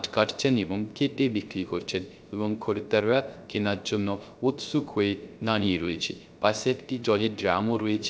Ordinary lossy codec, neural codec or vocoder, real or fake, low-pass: none; codec, 16 kHz, 0.3 kbps, FocalCodec; fake; none